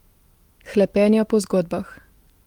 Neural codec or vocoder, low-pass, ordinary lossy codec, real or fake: autoencoder, 48 kHz, 128 numbers a frame, DAC-VAE, trained on Japanese speech; 19.8 kHz; Opus, 24 kbps; fake